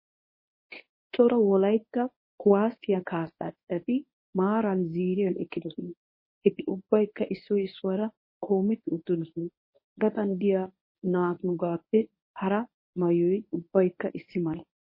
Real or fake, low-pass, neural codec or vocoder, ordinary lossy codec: fake; 5.4 kHz; codec, 24 kHz, 0.9 kbps, WavTokenizer, medium speech release version 1; MP3, 24 kbps